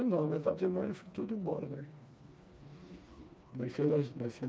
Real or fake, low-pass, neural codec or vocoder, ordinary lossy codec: fake; none; codec, 16 kHz, 2 kbps, FreqCodec, smaller model; none